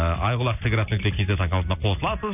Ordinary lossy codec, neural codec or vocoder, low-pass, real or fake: none; none; 3.6 kHz; real